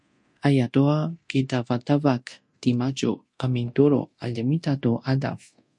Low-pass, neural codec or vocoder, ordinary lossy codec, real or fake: 10.8 kHz; codec, 24 kHz, 0.9 kbps, DualCodec; MP3, 48 kbps; fake